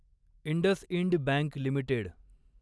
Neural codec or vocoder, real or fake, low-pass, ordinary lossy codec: none; real; none; none